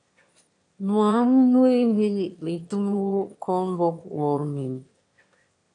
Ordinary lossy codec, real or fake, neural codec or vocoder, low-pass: AAC, 48 kbps; fake; autoencoder, 22.05 kHz, a latent of 192 numbers a frame, VITS, trained on one speaker; 9.9 kHz